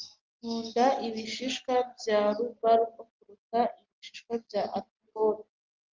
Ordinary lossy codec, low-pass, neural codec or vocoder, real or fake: Opus, 32 kbps; 7.2 kHz; none; real